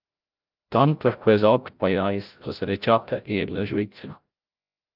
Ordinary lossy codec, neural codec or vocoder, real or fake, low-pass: Opus, 32 kbps; codec, 16 kHz, 0.5 kbps, FreqCodec, larger model; fake; 5.4 kHz